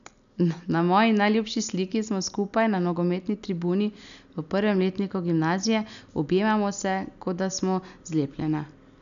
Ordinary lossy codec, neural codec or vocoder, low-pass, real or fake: none; none; 7.2 kHz; real